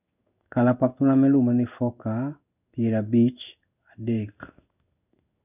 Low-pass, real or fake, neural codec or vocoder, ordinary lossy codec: 3.6 kHz; fake; codec, 16 kHz in and 24 kHz out, 1 kbps, XY-Tokenizer; none